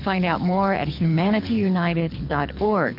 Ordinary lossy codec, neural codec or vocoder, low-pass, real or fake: MP3, 48 kbps; codec, 24 kHz, 6 kbps, HILCodec; 5.4 kHz; fake